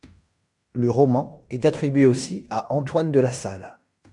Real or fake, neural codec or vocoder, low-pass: fake; codec, 16 kHz in and 24 kHz out, 0.9 kbps, LongCat-Audio-Codec, fine tuned four codebook decoder; 10.8 kHz